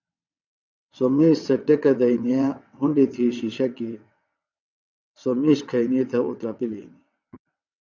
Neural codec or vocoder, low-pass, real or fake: vocoder, 22.05 kHz, 80 mel bands, WaveNeXt; 7.2 kHz; fake